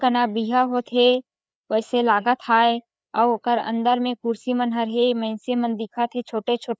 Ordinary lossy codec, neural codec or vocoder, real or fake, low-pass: none; codec, 16 kHz, 4 kbps, FreqCodec, larger model; fake; none